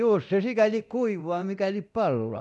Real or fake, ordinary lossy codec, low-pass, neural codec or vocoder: fake; none; none; codec, 24 kHz, 0.9 kbps, DualCodec